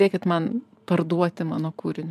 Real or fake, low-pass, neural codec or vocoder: fake; 14.4 kHz; vocoder, 44.1 kHz, 128 mel bands every 512 samples, BigVGAN v2